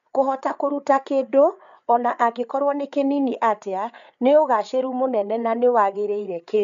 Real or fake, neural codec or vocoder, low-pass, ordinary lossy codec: fake; codec, 16 kHz, 8 kbps, FreqCodec, larger model; 7.2 kHz; none